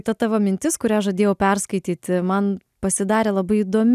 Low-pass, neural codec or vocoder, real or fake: 14.4 kHz; none; real